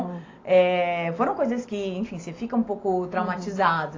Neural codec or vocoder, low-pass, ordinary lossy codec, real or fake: none; 7.2 kHz; AAC, 32 kbps; real